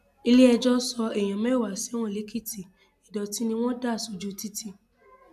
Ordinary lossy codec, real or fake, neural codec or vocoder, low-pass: AAC, 96 kbps; real; none; 14.4 kHz